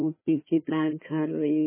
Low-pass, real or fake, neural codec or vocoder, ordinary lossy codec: 3.6 kHz; fake; codec, 16 kHz, 1 kbps, FunCodec, trained on Chinese and English, 50 frames a second; MP3, 16 kbps